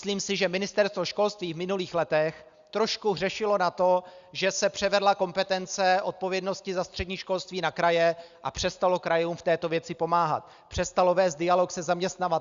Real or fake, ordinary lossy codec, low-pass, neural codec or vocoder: real; Opus, 64 kbps; 7.2 kHz; none